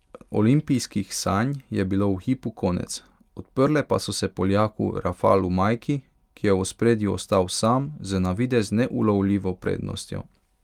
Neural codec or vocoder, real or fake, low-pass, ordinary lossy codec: none; real; 19.8 kHz; Opus, 32 kbps